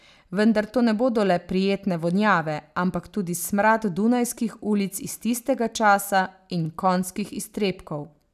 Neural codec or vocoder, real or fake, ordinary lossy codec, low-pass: none; real; none; 14.4 kHz